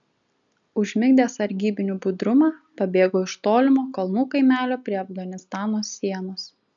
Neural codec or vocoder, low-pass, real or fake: none; 7.2 kHz; real